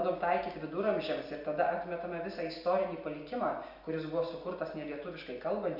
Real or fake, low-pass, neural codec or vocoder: real; 5.4 kHz; none